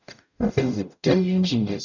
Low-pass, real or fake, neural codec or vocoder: 7.2 kHz; fake; codec, 44.1 kHz, 0.9 kbps, DAC